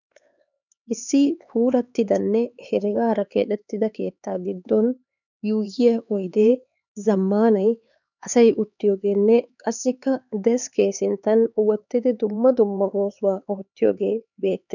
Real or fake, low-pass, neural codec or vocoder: fake; 7.2 kHz; codec, 16 kHz, 4 kbps, X-Codec, HuBERT features, trained on LibriSpeech